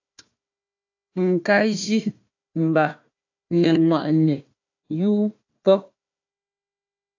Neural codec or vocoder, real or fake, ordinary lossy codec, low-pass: codec, 16 kHz, 1 kbps, FunCodec, trained on Chinese and English, 50 frames a second; fake; AAC, 48 kbps; 7.2 kHz